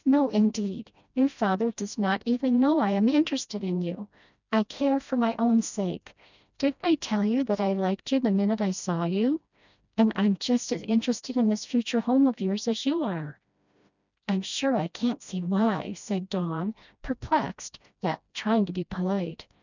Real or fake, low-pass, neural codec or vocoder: fake; 7.2 kHz; codec, 16 kHz, 1 kbps, FreqCodec, smaller model